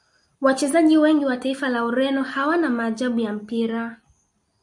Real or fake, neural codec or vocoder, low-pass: real; none; 10.8 kHz